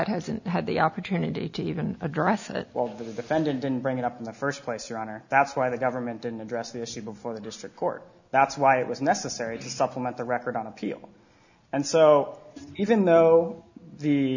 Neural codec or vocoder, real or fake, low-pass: none; real; 7.2 kHz